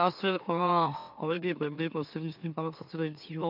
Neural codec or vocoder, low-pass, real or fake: autoencoder, 44.1 kHz, a latent of 192 numbers a frame, MeloTTS; 5.4 kHz; fake